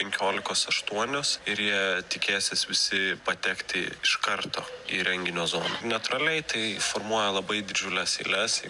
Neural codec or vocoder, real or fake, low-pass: none; real; 10.8 kHz